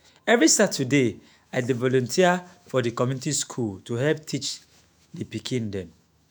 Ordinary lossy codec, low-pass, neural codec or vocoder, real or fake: none; none; autoencoder, 48 kHz, 128 numbers a frame, DAC-VAE, trained on Japanese speech; fake